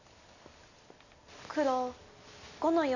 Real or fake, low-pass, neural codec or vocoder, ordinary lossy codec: real; 7.2 kHz; none; none